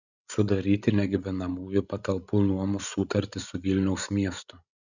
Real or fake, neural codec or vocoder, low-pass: fake; codec, 16 kHz, 16 kbps, FreqCodec, larger model; 7.2 kHz